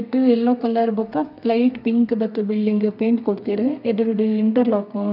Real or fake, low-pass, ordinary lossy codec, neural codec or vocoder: fake; 5.4 kHz; none; codec, 32 kHz, 1.9 kbps, SNAC